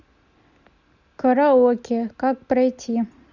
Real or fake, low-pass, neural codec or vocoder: real; 7.2 kHz; none